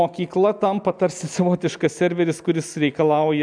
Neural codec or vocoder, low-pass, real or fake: none; 9.9 kHz; real